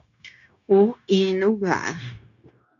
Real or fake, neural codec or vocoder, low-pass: fake; codec, 16 kHz, 0.9 kbps, LongCat-Audio-Codec; 7.2 kHz